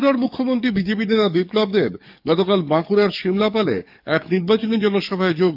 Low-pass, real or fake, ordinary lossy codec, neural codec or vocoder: 5.4 kHz; fake; none; codec, 44.1 kHz, 7.8 kbps, DAC